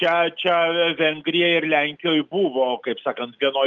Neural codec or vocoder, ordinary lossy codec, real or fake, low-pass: none; Opus, 24 kbps; real; 7.2 kHz